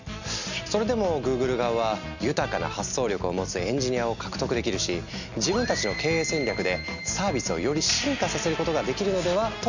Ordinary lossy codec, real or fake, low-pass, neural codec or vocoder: none; real; 7.2 kHz; none